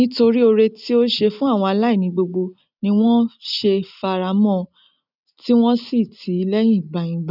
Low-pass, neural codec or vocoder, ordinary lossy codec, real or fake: 5.4 kHz; none; none; real